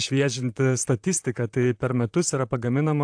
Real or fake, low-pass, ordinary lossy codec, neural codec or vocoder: fake; 9.9 kHz; AAC, 64 kbps; vocoder, 44.1 kHz, 128 mel bands, Pupu-Vocoder